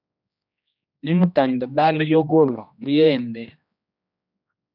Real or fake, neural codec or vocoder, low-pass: fake; codec, 16 kHz, 1 kbps, X-Codec, HuBERT features, trained on general audio; 5.4 kHz